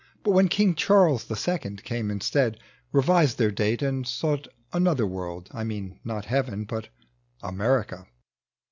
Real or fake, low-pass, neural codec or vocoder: real; 7.2 kHz; none